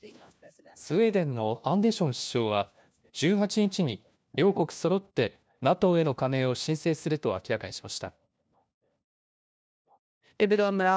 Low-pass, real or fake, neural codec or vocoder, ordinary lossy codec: none; fake; codec, 16 kHz, 1 kbps, FunCodec, trained on LibriTTS, 50 frames a second; none